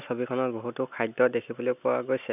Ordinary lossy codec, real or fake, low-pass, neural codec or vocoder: none; real; 3.6 kHz; none